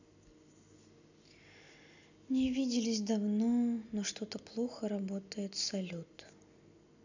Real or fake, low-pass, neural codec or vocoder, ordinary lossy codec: real; 7.2 kHz; none; none